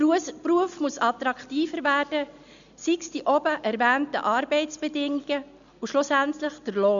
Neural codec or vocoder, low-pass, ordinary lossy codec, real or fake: none; 7.2 kHz; none; real